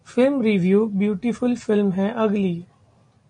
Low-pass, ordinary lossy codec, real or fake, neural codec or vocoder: 9.9 kHz; MP3, 48 kbps; real; none